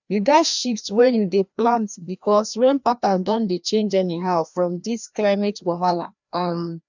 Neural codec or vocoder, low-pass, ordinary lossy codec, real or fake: codec, 16 kHz, 1 kbps, FreqCodec, larger model; 7.2 kHz; none; fake